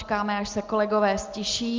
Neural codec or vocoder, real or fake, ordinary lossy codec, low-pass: none; real; Opus, 32 kbps; 7.2 kHz